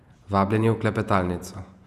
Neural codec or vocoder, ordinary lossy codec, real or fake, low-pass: vocoder, 48 kHz, 128 mel bands, Vocos; none; fake; 14.4 kHz